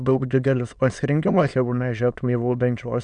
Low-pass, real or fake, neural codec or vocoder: 9.9 kHz; fake; autoencoder, 22.05 kHz, a latent of 192 numbers a frame, VITS, trained on many speakers